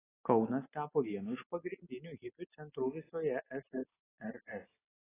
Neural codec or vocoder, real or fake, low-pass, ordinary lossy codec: none; real; 3.6 kHz; AAC, 16 kbps